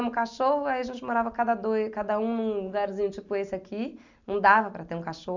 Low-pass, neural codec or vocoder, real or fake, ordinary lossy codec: 7.2 kHz; none; real; none